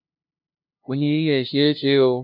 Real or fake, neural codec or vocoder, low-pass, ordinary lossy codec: fake; codec, 16 kHz, 0.5 kbps, FunCodec, trained on LibriTTS, 25 frames a second; 5.4 kHz; none